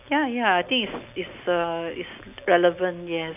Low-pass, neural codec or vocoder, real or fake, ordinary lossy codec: 3.6 kHz; none; real; none